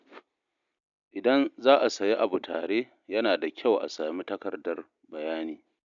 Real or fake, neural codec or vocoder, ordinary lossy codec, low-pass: real; none; none; 7.2 kHz